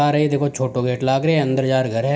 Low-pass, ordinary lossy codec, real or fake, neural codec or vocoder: none; none; real; none